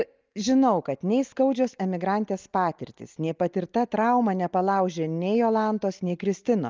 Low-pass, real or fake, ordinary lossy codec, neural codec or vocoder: 7.2 kHz; real; Opus, 32 kbps; none